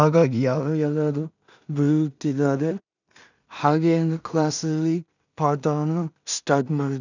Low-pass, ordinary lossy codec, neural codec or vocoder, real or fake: 7.2 kHz; none; codec, 16 kHz in and 24 kHz out, 0.4 kbps, LongCat-Audio-Codec, two codebook decoder; fake